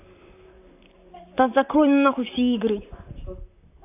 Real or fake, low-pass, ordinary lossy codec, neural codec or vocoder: fake; 3.6 kHz; none; vocoder, 44.1 kHz, 128 mel bands, Pupu-Vocoder